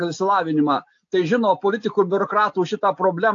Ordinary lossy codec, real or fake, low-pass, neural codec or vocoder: AAC, 48 kbps; real; 7.2 kHz; none